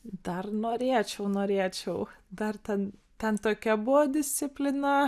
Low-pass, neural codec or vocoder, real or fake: 14.4 kHz; none; real